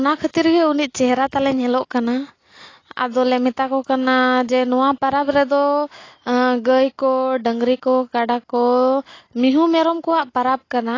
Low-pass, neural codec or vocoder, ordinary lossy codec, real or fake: 7.2 kHz; none; AAC, 32 kbps; real